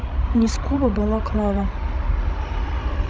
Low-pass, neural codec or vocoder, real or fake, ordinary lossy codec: none; codec, 16 kHz, 16 kbps, FreqCodec, larger model; fake; none